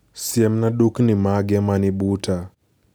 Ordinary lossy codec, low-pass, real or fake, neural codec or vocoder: none; none; real; none